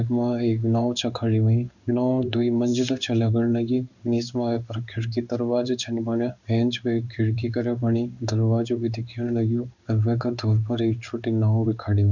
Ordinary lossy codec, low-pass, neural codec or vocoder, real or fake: none; 7.2 kHz; codec, 16 kHz in and 24 kHz out, 1 kbps, XY-Tokenizer; fake